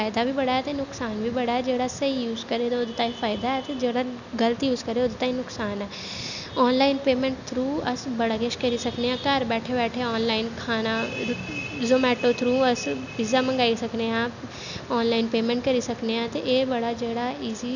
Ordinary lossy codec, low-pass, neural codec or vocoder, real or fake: none; 7.2 kHz; none; real